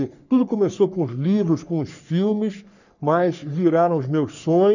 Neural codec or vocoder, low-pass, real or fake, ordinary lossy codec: codec, 44.1 kHz, 3.4 kbps, Pupu-Codec; 7.2 kHz; fake; none